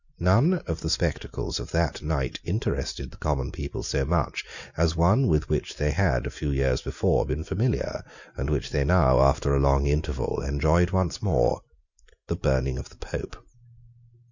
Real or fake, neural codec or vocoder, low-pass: real; none; 7.2 kHz